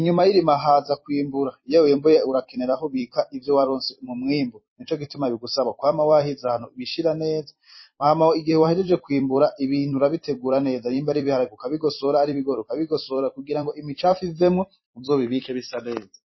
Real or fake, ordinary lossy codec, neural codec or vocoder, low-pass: fake; MP3, 24 kbps; vocoder, 44.1 kHz, 128 mel bands every 256 samples, BigVGAN v2; 7.2 kHz